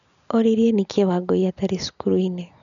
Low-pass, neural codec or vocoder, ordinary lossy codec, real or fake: 7.2 kHz; none; none; real